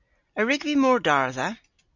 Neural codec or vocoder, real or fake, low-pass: none; real; 7.2 kHz